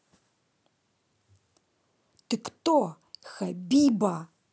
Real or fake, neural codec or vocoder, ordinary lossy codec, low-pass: real; none; none; none